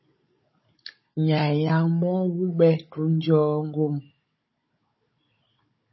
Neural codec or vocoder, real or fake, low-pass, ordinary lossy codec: codec, 16 kHz, 16 kbps, FunCodec, trained on Chinese and English, 50 frames a second; fake; 7.2 kHz; MP3, 24 kbps